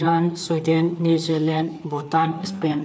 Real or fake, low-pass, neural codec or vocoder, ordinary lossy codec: fake; none; codec, 16 kHz, 4 kbps, FreqCodec, larger model; none